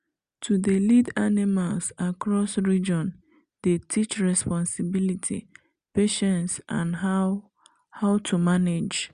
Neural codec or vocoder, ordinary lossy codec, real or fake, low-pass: none; Opus, 64 kbps; real; 9.9 kHz